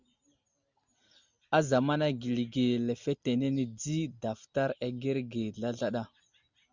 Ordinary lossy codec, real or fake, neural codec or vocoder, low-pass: Opus, 64 kbps; real; none; 7.2 kHz